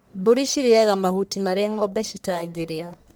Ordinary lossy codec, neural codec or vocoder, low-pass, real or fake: none; codec, 44.1 kHz, 1.7 kbps, Pupu-Codec; none; fake